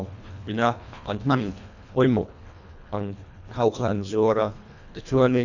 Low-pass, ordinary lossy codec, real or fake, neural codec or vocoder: 7.2 kHz; none; fake; codec, 24 kHz, 1.5 kbps, HILCodec